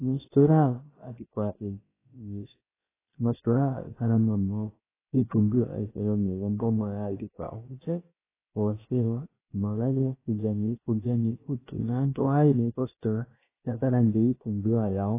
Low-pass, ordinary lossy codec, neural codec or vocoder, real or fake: 3.6 kHz; AAC, 16 kbps; codec, 16 kHz, about 1 kbps, DyCAST, with the encoder's durations; fake